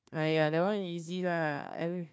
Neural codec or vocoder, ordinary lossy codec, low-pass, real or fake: codec, 16 kHz, 1 kbps, FunCodec, trained on Chinese and English, 50 frames a second; none; none; fake